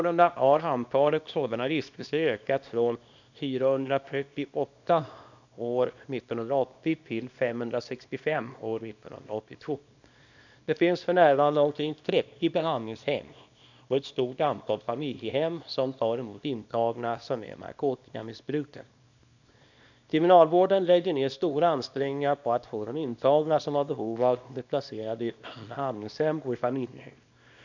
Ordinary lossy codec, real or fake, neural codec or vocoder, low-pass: none; fake; codec, 24 kHz, 0.9 kbps, WavTokenizer, small release; 7.2 kHz